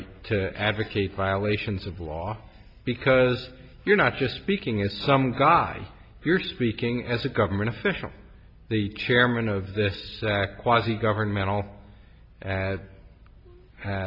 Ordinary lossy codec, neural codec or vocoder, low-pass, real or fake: MP3, 48 kbps; none; 5.4 kHz; real